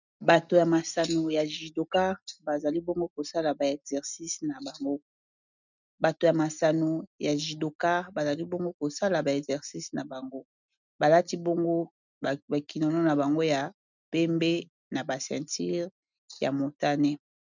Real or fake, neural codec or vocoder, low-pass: real; none; 7.2 kHz